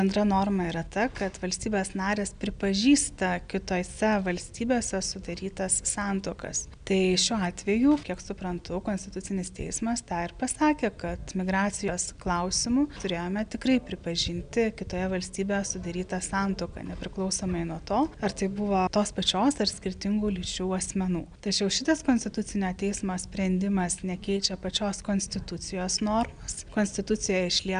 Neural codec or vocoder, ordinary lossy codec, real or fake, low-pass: none; MP3, 96 kbps; real; 9.9 kHz